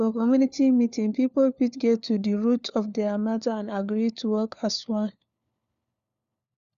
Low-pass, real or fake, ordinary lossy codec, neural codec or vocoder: 7.2 kHz; fake; Opus, 64 kbps; codec, 16 kHz, 4 kbps, FunCodec, trained on LibriTTS, 50 frames a second